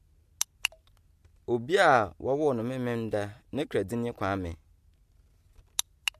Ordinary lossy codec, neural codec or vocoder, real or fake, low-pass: MP3, 64 kbps; none; real; 14.4 kHz